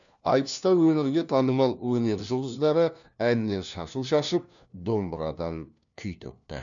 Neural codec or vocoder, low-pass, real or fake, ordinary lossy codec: codec, 16 kHz, 1 kbps, FunCodec, trained on LibriTTS, 50 frames a second; 7.2 kHz; fake; none